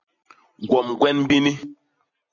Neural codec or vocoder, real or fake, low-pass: none; real; 7.2 kHz